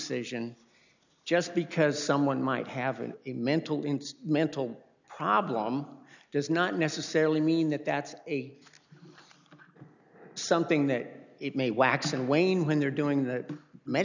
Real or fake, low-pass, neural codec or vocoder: real; 7.2 kHz; none